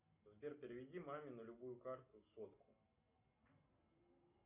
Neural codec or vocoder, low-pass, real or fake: none; 3.6 kHz; real